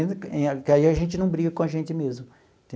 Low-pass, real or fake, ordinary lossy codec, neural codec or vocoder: none; real; none; none